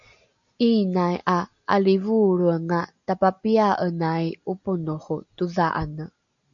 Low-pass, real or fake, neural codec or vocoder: 7.2 kHz; real; none